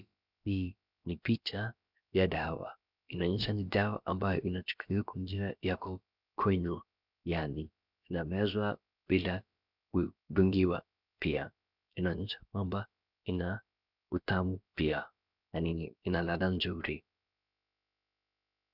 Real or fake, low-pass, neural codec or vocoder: fake; 5.4 kHz; codec, 16 kHz, about 1 kbps, DyCAST, with the encoder's durations